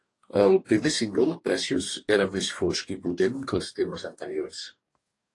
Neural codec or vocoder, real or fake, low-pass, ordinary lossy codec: codec, 44.1 kHz, 2.6 kbps, DAC; fake; 10.8 kHz; AAC, 48 kbps